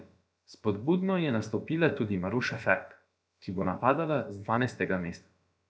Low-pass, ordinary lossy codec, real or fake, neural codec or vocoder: none; none; fake; codec, 16 kHz, about 1 kbps, DyCAST, with the encoder's durations